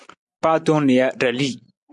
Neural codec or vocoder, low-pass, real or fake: none; 10.8 kHz; real